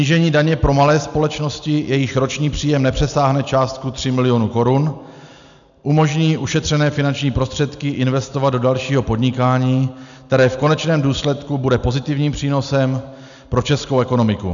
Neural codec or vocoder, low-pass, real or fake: none; 7.2 kHz; real